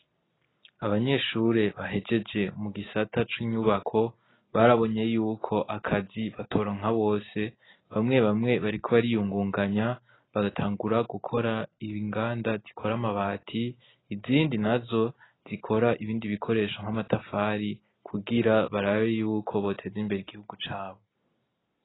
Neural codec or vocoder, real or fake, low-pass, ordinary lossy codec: none; real; 7.2 kHz; AAC, 16 kbps